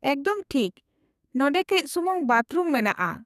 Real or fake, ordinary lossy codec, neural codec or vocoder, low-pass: fake; none; codec, 32 kHz, 1.9 kbps, SNAC; 14.4 kHz